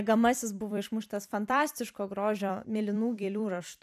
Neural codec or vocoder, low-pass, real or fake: vocoder, 44.1 kHz, 128 mel bands every 256 samples, BigVGAN v2; 14.4 kHz; fake